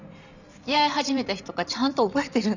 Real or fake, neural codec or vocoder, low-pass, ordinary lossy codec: fake; vocoder, 44.1 kHz, 128 mel bands every 512 samples, BigVGAN v2; 7.2 kHz; none